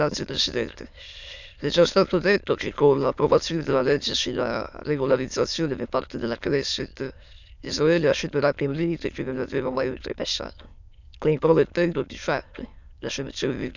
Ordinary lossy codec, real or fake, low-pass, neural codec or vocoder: none; fake; 7.2 kHz; autoencoder, 22.05 kHz, a latent of 192 numbers a frame, VITS, trained on many speakers